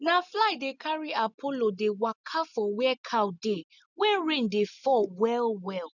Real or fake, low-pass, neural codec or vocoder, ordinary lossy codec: real; 7.2 kHz; none; none